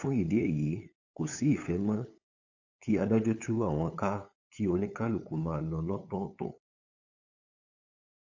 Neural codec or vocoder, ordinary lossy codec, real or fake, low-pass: codec, 16 kHz, 8 kbps, FunCodec, trained on LibriTTS, 25 frames a second; AAC, 48 kbps; fake; 7.2 kHz